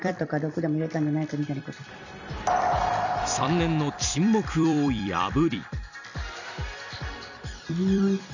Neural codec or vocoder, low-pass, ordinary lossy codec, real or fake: vocoder, 44.1 kHz, 128 mel bands every 256 samples, BigVGAN v2; 7.2 kHz; none; fake